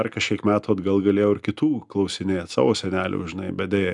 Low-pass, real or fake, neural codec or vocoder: 10.8 kHz; real; none